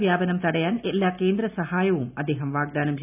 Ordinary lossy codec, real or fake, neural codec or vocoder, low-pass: none; real; none; 3.6 kHz